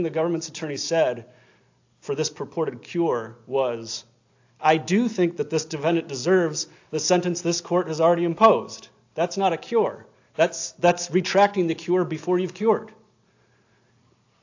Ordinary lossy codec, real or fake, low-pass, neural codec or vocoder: AAC, 48 kbps; real; 7.2 kHz; none